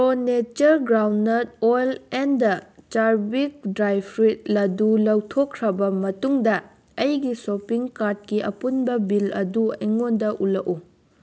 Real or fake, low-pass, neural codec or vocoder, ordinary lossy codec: real; none; none; none